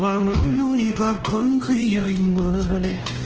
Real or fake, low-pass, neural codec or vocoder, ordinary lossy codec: fake; 7.2 kHz; codec, 16 kHz, 1 kbps, X-Codec, WavLM features, trained on Multilingual LibriSpeech; Opus, 16 kbps